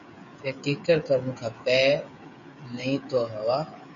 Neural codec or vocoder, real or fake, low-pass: codec, 16 kHz, 16 kbps, FreqCodec, smaller model; fake; 7.2 kHz